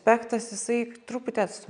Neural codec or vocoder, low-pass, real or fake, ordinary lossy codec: none; 9.9 kHz; real; MP3, 96 kbps